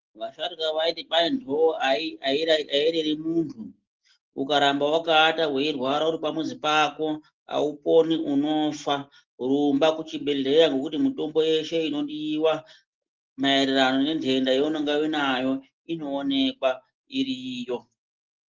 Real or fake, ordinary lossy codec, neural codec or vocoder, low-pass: real; Opus, 16 kbps; none; 7.2 kHz